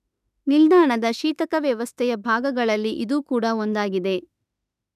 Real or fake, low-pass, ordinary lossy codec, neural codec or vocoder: fake; 14.4 kHz; none; autoencoder, 48 kHz, 32 numbers a frame, DAC-VAE, trained on Japanese speech